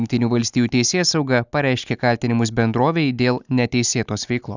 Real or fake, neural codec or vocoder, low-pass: real; none; 7.2 kHz